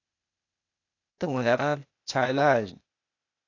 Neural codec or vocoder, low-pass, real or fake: codec, 16 kHz, 0.8 kbps, ZipCodec; 7.2 kHz; fake